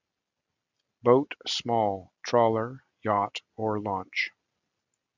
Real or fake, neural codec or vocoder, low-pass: real; none; 7.2 kHz